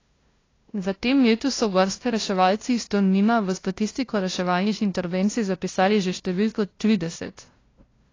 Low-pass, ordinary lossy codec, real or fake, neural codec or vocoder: 7.2 kHz; AAC, 32 kbps; fake; codec, 16 kHz, 0.5 kbps, FunCodec, trained on LibriTTS, 25 frames a second